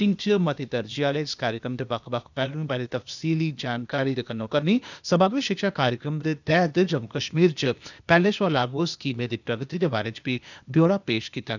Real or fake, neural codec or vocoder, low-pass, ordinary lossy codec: fake; codec, 16 kHz, 0.8 kbps, ZipCodec; 7.2 kHz; none